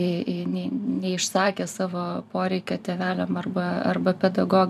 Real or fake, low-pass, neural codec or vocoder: fake; 14.4 kHz; vocoder, 44.1 kHz, 128 mel bands every 512 samples, BigVGAN v2